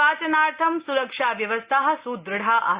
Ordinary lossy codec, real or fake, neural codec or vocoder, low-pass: Opus, 64 kbps; real; none; 3.6 kHz